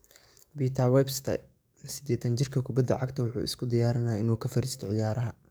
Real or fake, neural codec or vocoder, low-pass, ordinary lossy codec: fake; codec, 44.1 kHz, 7.8 kbps, DAC; none; none